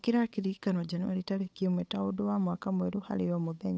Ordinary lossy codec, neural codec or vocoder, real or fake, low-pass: none; codec, 16 kHz, 8 kbps, FunCodec, trained on Chinese and English, 25 frames a second; fake; none